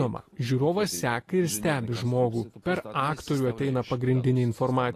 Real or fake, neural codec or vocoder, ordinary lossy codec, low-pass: real; none; AAC, 48 kbps; 14.4 kHz